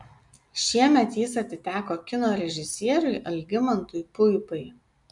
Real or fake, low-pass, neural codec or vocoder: real; 10.8 kHz; none